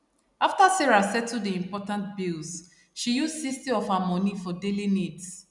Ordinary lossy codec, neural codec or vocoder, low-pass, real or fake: none; none; 10.8 kHz; real